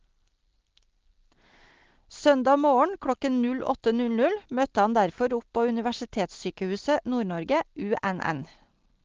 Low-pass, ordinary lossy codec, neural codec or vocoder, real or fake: 7.2 kHz; Opus, 16 kbps; none; real